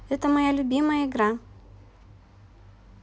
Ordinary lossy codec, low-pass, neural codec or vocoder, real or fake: none; none; none; real